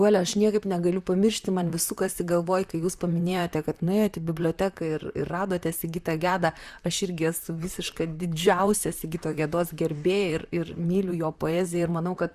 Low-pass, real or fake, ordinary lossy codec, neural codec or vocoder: 14.4 kHz; fake; Opus, 64 kbps; vocoder, 44.1 kHz, 128 mel bands, Pupu-Vocoder